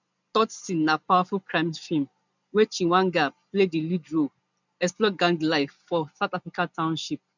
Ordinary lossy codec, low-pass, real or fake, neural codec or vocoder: none; 7.2 kHz; real; none